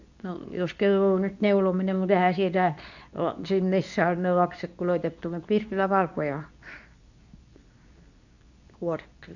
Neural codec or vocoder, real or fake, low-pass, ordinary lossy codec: codec, 24 kHz, 0.9 kbps, WavTokenizer, medium speech release version 2; fake; 7.2 kHz; none